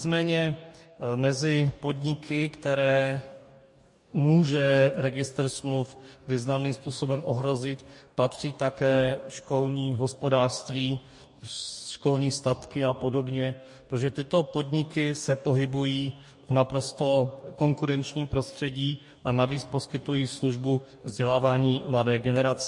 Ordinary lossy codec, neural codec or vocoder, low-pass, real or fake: MP3, 48 kbps; codec, 44.1 kHz, 2.6 kbps, DAC; 10.8 kHz; fake